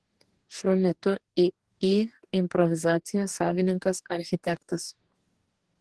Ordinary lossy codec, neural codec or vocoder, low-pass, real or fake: Opus, 16 kbps; codec, 44.1 kHz, 2.6 kbps, DAC; 10.8 kHz; fake